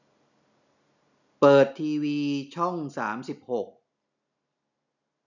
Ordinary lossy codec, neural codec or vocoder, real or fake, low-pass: none; none; real; 7.2 kHz